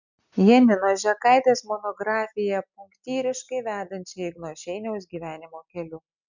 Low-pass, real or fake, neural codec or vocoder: 7.2 kHz; real; none